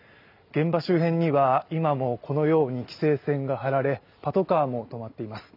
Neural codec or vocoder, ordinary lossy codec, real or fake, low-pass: none; none; real; 5.4 kHz